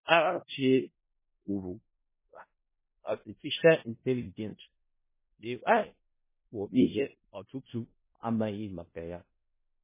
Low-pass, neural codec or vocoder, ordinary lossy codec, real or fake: 3.6 kHz; codec, 16 kHz in and 24 kHz out, 0.4 kbps, LongCat-Audio-Codec, four codebook decoder; MP3, 16 kbps; fake